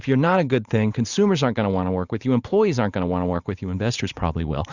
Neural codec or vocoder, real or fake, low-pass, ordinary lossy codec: vocoder, 44.1 kHz, 128 mel bands every 512 samples, BigVGAN v2; fake; 7.2 kHz; Opus, 64 kbps